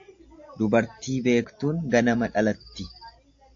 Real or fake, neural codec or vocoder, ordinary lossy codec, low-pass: real; none; AAC, 48 kbps; 7.2 kHz